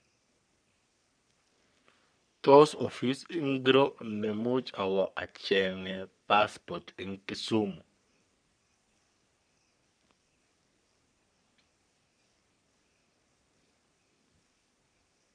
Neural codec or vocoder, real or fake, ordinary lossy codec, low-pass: codec, 44.1 kHz, 3.4 kbps, Pupu-Codec; fake; none; 9.9 kHz